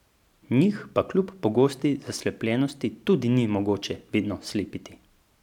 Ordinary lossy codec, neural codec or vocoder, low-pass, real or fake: none; vocoder, 44.1 kHz, 128 mel bands every 512 samples, BigVGAN v2; 19.8 kHz; fake